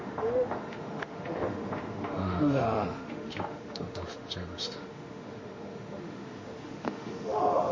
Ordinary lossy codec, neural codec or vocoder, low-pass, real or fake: MP3, 32 kbps; autoencoder, 48 kHz, 32 numbers a frame, DAC-VAE, trained on Japanese speech; 7.2 kHz; fake